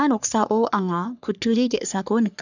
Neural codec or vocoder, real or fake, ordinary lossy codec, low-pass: codec, 44.1 kHz, 3.4 kbps, Pupu-Codec; fake; none; 7.2 kHz